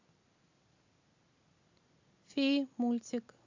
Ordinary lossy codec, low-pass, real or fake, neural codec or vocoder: AAC, 48 kbps; 7.2 kHz; real; none